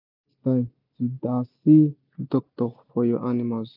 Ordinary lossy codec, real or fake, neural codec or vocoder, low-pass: none; real; none; 5.4 kHz